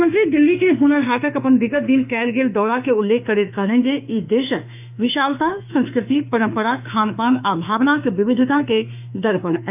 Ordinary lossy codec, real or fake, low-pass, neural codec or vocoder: none; fake; 3.6 kHz; autoencoder, 48 kHz, 32 numbers a frame, DAC-VAE, trained on Japanese speech